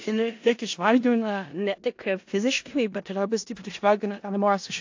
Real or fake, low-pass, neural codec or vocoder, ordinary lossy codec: fake; 7.2 kHz; codec, 16 kHz in and 24 kHz out, 0.4 kbps, LongCat-Audio-Codec, four codebook decoder; AAC, 48 kbps